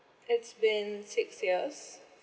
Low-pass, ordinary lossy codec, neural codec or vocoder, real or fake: none; none; none; real